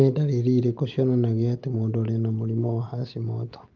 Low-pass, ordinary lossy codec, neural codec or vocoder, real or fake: 7.2 kHz; Opus, 32 kbps; none; real